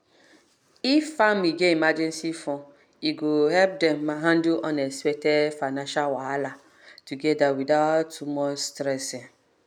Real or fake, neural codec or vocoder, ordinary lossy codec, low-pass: real; none; none; 19.8 kHz